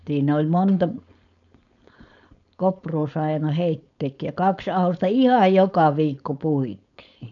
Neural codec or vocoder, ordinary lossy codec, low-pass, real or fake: codec, 16 kHz, 4.8 kbps, FACodec; none; 7.2 kHz; fake